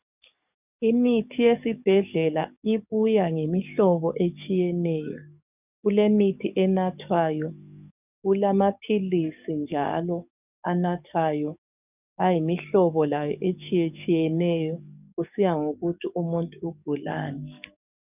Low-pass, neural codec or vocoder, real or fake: 3.6 kHz; codec, 44.1 kHz, 7.8 kbps, DAC; fake